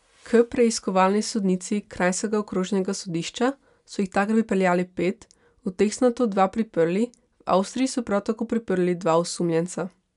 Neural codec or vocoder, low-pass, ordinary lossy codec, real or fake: none; 10.8 kHz; none; real